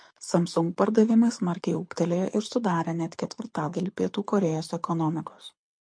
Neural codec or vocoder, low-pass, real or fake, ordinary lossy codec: codec, 24 kHz, 6 kbps, HILCodec; 9.9 kHz; fake; MP3, 48 kbps